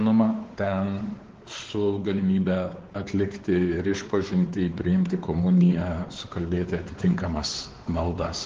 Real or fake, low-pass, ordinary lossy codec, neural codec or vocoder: fake; 7.2 kHz; Opus, 16 kbps; codec, 16 kHz, 4 kbps, X-Codec, WavLM features, trained on Multilingual LibriSpeech